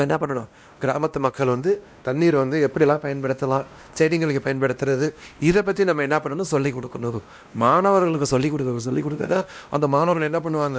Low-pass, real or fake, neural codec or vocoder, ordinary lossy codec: none; fake; codec, 16 kHz, 1 kbps, X-Codec, WavLM features, trained on Multilingual LibriSpeech; none